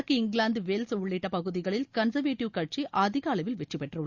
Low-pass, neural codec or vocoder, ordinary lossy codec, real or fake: 7.2 kHz; none; Opus, 64 kbps; real